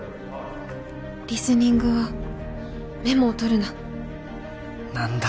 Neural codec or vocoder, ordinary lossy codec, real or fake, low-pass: none; none; real; none